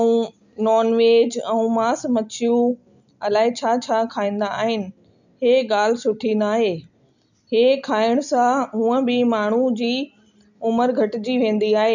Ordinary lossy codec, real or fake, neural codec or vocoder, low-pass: none; real; none; 7.2 kHz